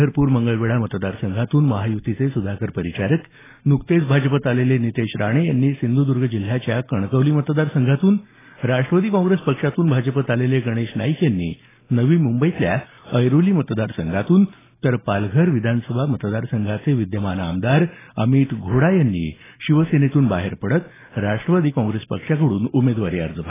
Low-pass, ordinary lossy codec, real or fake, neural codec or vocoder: 3.6 kHz; AAC, 16 kbps; real; none